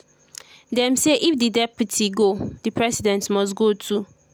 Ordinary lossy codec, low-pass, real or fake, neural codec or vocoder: none; none; real; none